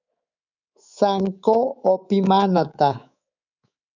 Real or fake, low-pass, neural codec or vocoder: fake; 7.2 kHz; codec, 24 kHz, 3.1 kbps, DualCodec